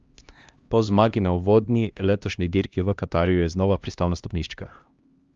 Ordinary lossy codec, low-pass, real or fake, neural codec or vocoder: Opus, 32 kbps; 7.2 kHz; fake; codec, 16 kHz, 1 kbps, X-Codec, HuBERT features, trained on LibriSpeech